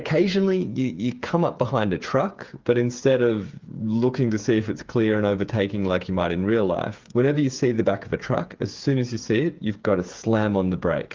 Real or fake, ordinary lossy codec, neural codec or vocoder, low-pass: fake; Opus, 32 kbps; codec, 16 kHz, 8 kbps, FreqCodec, smaller model; 7.2 kHz